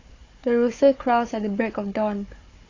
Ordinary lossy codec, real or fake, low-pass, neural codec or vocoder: AAC, 32 kbps; fake; 7.2 kHz; codec, 16 kHz, 4 kbps, FunCodec, trained on Chinese and English, 50 frames a second